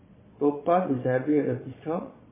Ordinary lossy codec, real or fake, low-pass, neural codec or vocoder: MP3, 16 kbps; fake; 3.6 kHz; vocoder, 22.05 kHz, 80 mel bands, Vocos